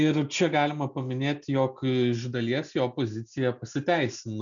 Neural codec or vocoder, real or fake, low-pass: none; real; 7.2 kHz